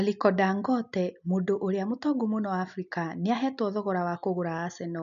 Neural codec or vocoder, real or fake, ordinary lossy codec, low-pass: none; real; none; 7.2 kHz